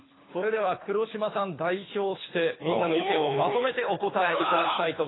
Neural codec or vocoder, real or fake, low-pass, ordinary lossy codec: codec, 24 kHz, 3 kbps, HILCodec; fake; 7.2 kHz; AAC, 16 kbps